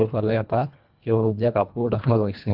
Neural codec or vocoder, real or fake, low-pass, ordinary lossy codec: codec, 24 kHz, 1.5 kbps, HILCodec; fake; 5.4 kHz; Opus, 24 kbps